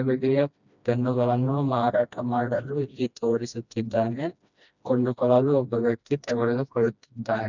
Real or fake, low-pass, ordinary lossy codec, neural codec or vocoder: fake; 7.2 kHz; none; codec, 16 kHz, 1 kbps, FreqCodec, smaller model